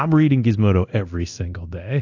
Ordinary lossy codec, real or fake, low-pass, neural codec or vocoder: AAC, 48 kbps; fake; 7.2 kHz; codec, 24 kHz, 0.9 kbps, DualCodec